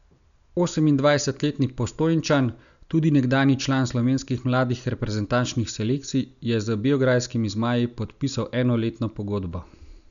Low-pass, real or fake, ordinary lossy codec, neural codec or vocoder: 7.2 kHz; real; none; none